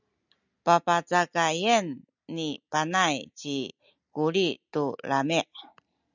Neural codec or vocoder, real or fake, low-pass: none; real; 7.2 kHz